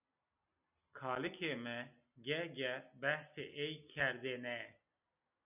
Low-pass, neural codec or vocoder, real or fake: 3.6 kHz; none; real